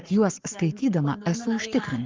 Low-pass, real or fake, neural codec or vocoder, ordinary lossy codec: 7.2 kHz; fake; codec, 44.1 kHz, 7.8 kbps, Pupu-Codec; Opus, 32 kbps